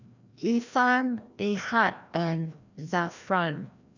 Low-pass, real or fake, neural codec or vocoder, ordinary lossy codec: 7.2 kHz; fake; codec, 16 kHz, 1 kbps, FreqCodec, larger model; none